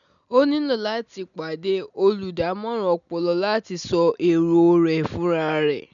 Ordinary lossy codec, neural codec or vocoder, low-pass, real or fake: none; none; 7.2 kHz; real